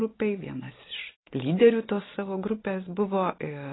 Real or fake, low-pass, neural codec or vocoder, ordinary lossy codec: real; 7.2 kHz; none; AAC, 16 kbps